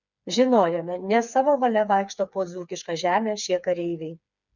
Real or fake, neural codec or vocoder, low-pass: fake; codec, 16 kHz, 4 kbps, FreqCodec, smaller model; 7.2 kHz